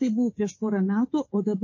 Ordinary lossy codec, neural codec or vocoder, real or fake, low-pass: MP3, 32 kbps; codec, 24 kHz, 3.1 kbps, DualCodec; fake; 7.2 kHz